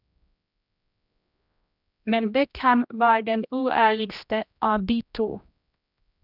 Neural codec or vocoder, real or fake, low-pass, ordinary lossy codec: codec, 16 kHz, 1 kbps, X-Codec, HuBERT features, trained on general audio; fake; 5.4 kHz; none